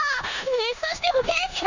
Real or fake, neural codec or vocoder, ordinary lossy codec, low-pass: fake; codec, 16 kHz in and 24 kHz out, 0.9 kbps, LongCat-Audio-Codec, four codebook decoder; none; 7.2 kHz